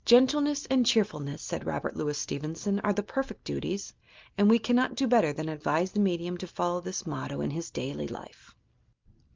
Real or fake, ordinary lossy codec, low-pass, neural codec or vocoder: real; Opus, 32 kbps; 7.2 kHz; none